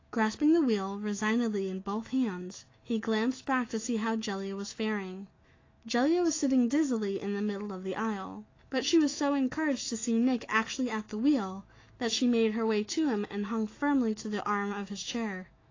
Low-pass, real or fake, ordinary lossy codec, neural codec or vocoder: 7.2 kHz; fake; AAC, 32 kbps; autoencoder, 48 kHz, 128 numbers a frame, DAC-VAE, trained on Japanese speech